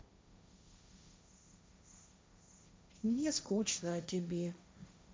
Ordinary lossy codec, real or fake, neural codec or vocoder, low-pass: none; fake; codec, 16 kHz, 1.1 kbps, Voila-Tokenizer; none